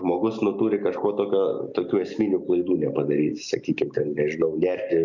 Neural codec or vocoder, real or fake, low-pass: none; real; 7.2 kHz